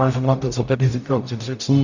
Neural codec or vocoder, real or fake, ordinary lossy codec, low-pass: codec, 44.1 kHz, 0.9 kbps, DAC; fake; MP3, 64 kbps; 7.2 kHz